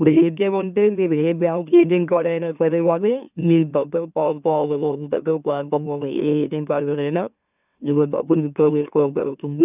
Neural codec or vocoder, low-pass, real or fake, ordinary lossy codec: autoencoder, 44.1 kHz, a latent of 192 numbers a frame, MeloTTS; 3.6 kHz; fake; none